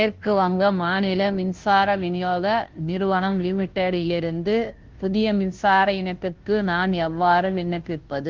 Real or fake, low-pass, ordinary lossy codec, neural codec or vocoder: fake; 7.2 kHz; Opus, 16 kbps; codec, 16 kHz, 0.5 kbps, FunCodec, trained on Chinese and English, 25 frames a second